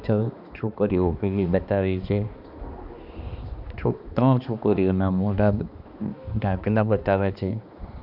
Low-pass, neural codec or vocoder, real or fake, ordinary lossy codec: 5.4 kHz; codec, 16 kHz, 2 kbps, X-Codec, HuBERT features, trained on balanced general audio; fake; none